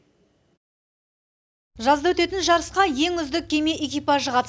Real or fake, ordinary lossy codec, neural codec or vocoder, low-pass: real; none; none; none